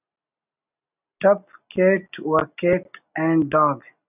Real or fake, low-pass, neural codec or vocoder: real; 3.6 kHz; none